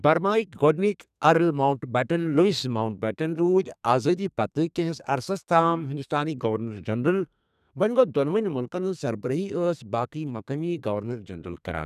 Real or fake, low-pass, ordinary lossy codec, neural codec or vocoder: fake; 14.4 kHz; none; codec, 32 kHz, 1.9 kbps, SNAC